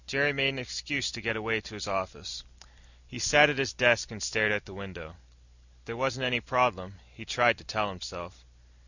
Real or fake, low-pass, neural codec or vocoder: real; 7.2 kHz; none